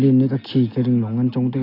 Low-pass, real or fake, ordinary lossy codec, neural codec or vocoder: 5.4 kHz; real; AAC, 32 kbps; none